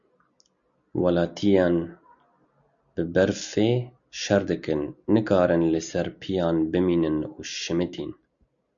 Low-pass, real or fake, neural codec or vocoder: 7.2 kHz; real; none